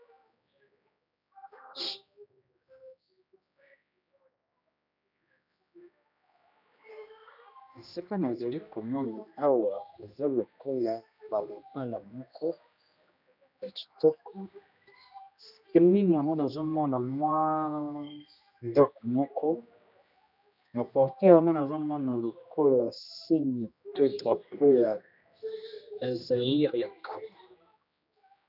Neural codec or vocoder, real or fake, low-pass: codec, 16 kHz, 1 kbps, X-Codec, HuBERT features, trained on general audio; fake; 5.4 kHz